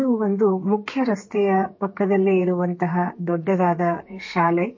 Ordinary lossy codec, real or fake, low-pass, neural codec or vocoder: MP3, 32 kbps; fake; 7.2 kHz; codec, 32 kHz, 1.9 kbps, SNAC